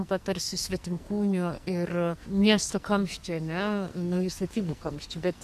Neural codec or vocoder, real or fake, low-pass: codec, 44.1 kHz, 2.6 kbps, SNAC; fake; 14.4 kHz